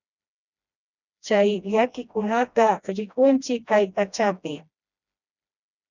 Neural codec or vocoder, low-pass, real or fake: codec, 16 kHz, 1 kbps, FreqCodec, smaller model; 7.2 kHz; fake